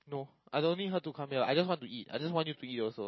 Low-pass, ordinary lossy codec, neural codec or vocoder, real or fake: 7.2 kHz; MP3, 24 kbps; none; real